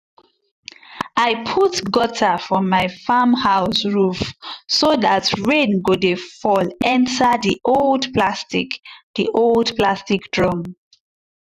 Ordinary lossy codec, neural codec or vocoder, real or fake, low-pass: none; vocoder, 44.1 kHz, 128 mel bands every 512 samples, BigVGAN v2; fake; 14.4 kHz